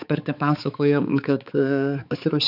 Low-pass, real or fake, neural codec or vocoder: 5.4 kHz; fake; codec, 16 kHz, 4 kbps, X-Codec, HuBERT features, trained on balanced general audio